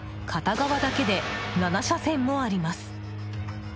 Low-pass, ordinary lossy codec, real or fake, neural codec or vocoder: none; none; real; none